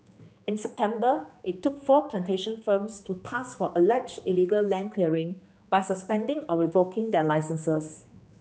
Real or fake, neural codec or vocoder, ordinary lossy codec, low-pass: fake; codec, 16 kHz, 2 kbps, X-Codec, HuBERT features, trained on general audio; none; none